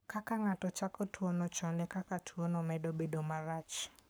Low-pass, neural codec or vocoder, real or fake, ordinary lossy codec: none; codec, 44.1 kHz, 7.8 kbps, Pupu-Codec; fake; none